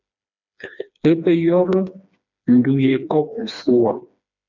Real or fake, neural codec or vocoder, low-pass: fake; codec, 16 kHz, 2 kbps, FreqCodec, smaller model; 7.2 kHz